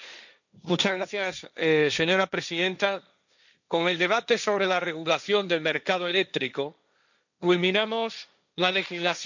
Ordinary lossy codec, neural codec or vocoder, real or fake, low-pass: none; codec, 16 kHz, 1.1 kbps, Voila-Tokenizer; fake; 7.2 kHz